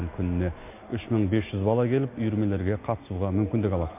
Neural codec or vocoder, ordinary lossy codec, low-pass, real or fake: none; MP3, 24 kbps; 3.6 kHz; real